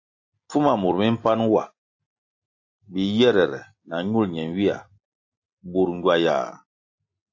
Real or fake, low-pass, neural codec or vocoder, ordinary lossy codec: real; 7.2 kHz; none; AAC, 48 kbps